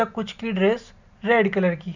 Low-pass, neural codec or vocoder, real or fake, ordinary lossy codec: 7.2 kHz; none; real; none